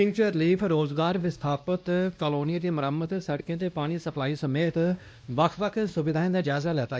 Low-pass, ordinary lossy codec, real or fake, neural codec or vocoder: none; none; fake; codec, 16 kHz, 1 kbps, X-Codec, WavLM features, trained on Multilingual LibriSpeech